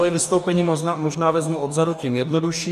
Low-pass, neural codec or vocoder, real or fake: 14.4 kHz; codec, 44.1 kHz, 2.6 kbps, DAC; fake